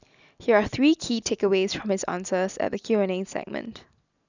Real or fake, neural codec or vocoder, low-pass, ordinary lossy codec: real; none; 7.2 kHz; none